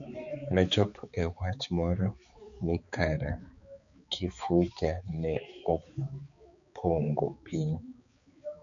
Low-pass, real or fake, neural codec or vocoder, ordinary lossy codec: 7.2 kHz; fake; codec, 16 kHz, 4 kbps, X-Codec, HuBERT features, trained on balanced general audio; MP3, 96 kbps